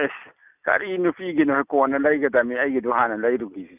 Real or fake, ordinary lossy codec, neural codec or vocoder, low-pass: real; none; none; 3.6 kHz